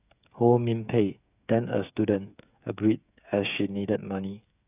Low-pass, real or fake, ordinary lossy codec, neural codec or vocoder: 3.6 kHz; fake; none; codec, 16 kHz, 8 kbps, FreqCodec, smaller model